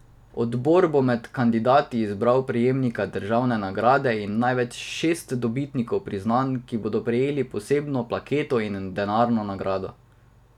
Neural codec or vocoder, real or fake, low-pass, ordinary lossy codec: none; real; 19.8 kHz; none